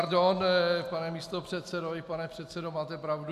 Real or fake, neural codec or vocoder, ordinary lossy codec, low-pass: real; none; AAC, 96 kbps; 14.4 kHz